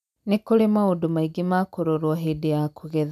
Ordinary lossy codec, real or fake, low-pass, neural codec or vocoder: none; real; 14.4 kHz; none